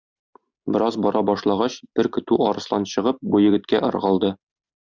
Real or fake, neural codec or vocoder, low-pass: real; none; 7.2 kHz